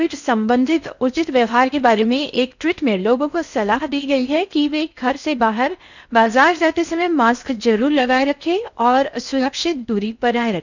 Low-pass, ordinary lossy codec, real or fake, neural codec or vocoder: 7.2 kHz; none; fake; codec, 16 kHz in and 24 kHz out, 0.6 kbps, FocalCodec, streaming, 4096 codes